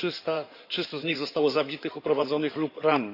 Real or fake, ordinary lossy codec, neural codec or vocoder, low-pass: fake; none; codec, 16 kHz in and 24 kHz out, 2.2 kbps, FireRedTTS-2 codec; 5.4 kHz